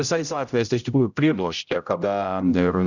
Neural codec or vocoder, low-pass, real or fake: codec, 16 kHz, 0.5 kbps, X-Codec, HuBERT features, trained on general audio; 7.2 kHz; fake